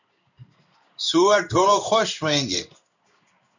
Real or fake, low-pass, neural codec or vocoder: fake; 7.2 kHz; codec, 16 kHz in and 24 kHz out, 1 kbps, XY-Tokenizer